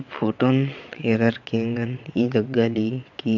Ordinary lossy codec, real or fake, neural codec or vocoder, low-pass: MP3, 64 kbps; fake; vocoder, 22.05 kHz, 80 mel bands, WaveNeXt; 7.2 kHz